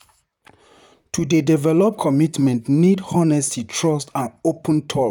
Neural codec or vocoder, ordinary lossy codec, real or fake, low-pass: vocoder, 44.1 kHz, 128 mel bands, Pupu-Vocoder; none; fake; 19.8 kHz